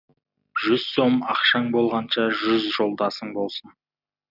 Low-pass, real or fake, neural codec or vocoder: 5.4 kHz; real; none